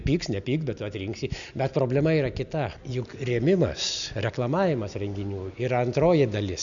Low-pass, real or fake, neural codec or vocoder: 7.2 kHz; real; none